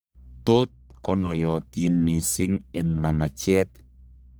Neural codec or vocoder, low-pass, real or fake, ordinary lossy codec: codec, 44.1 kHz, 1.7 kbps, Pupu-Codec; none; fake; none